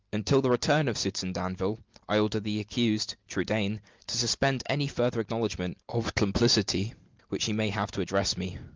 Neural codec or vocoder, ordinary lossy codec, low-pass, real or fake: none; Opus, 32 kbps; 7.2 kHz; real